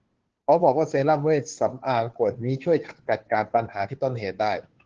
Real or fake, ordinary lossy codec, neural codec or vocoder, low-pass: fake; Opus, 16 kbps; codec, 16 kHz, 2 kbps, FunCodec, trained on Chinese and English, 25 frames a second; 7.2 kHz